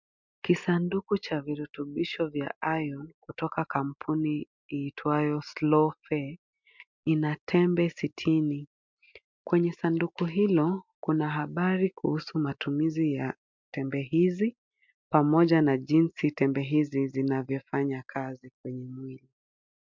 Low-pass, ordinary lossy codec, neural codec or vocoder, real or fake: 7.2 kHz; MP3, 64 kbps; none; real